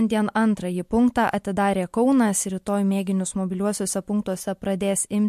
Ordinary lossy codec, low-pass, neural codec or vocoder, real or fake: MP3, 64 kbps; 14.4 kHz; none; real